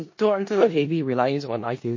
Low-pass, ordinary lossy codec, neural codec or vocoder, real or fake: 7.2 kHz; MP3, 32 kbps; codec, 16 kHz in and 24 kHz out, 0.4 kbps, LongCat-Audio-Codec, four codebook decoder; fake